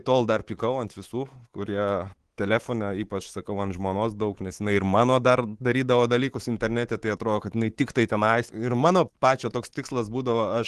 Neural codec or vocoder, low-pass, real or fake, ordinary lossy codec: codec, 24 kHz, 3.1 kbps, DualCodec; 10.8 kHz; fake; Opus, 16 kbps